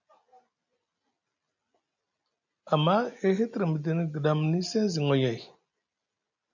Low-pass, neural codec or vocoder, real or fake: 7.2 kHz; none; real